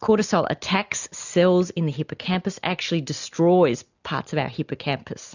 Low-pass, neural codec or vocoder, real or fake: 7.2 kHz; none; real